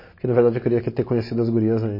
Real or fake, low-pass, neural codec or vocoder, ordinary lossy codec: real; 5.4 kHz; none; MP3, 24 kbps